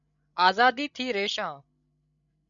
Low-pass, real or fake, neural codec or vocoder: 7.2 kHz; fake; codec, 16 kHz, 16 kbps, FreqCodec, larger model